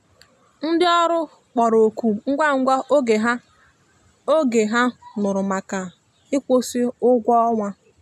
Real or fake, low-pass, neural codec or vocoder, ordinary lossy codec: real; 14.4 kHz; none; none